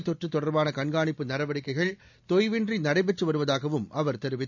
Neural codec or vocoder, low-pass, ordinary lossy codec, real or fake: none; 7.2 kHz; none; real